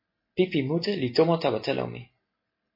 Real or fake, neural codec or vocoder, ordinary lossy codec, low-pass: real; none; MP3, 24 kbps; 5.4 kHz